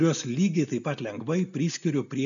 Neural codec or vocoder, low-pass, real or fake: none; 7.2 kHz; real